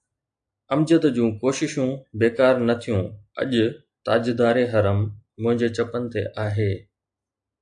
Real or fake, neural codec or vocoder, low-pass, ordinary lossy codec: real; none; 9.9 kHz; AAC, 64 kbps